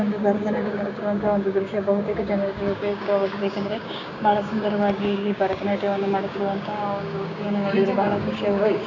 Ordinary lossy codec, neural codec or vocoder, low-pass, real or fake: none; codec, 16 kHz, 6 kbps, DAC; 7.2 kHz; fake